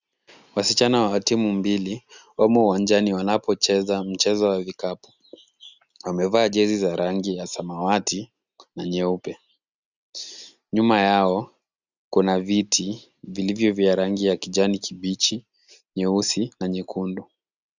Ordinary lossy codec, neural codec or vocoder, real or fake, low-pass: Opus, 64 kbps; none; real; 7.2 kHz